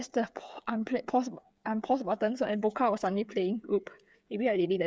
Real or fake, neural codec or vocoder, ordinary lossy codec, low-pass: fake; codec, 16 kHz, 8 kbps, FreqCodec, smaller model; none; none